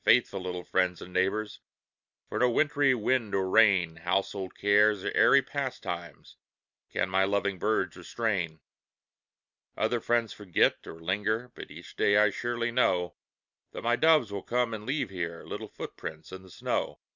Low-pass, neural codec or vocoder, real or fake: 7.2 kHz; none; real